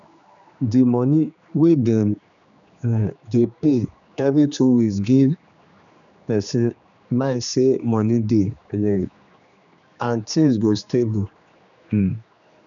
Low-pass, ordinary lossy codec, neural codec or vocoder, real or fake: 7.2 kHz; none; codec, 16 kHz, 2 kbps, X-Codec, HuBERT features, trained on general audio; fake